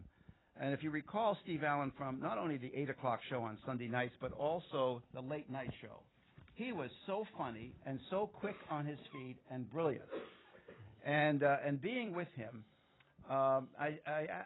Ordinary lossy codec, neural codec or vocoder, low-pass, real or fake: AAC, 16 kbps; vocoder, 44.1 kHz, 128 mel bands every 256 samples, BigVGAN v2; 7.2 kHz; fake